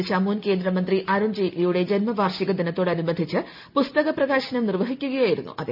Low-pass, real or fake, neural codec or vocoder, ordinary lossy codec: 5.4 kHz; real; none; none